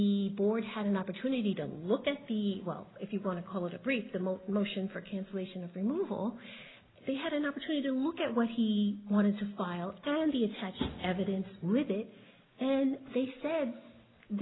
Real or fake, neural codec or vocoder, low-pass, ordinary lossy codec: real; none; 7.2 kHz; AAC, 16 kbps